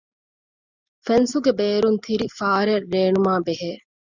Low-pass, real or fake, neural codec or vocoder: 7.2 kHz; real; none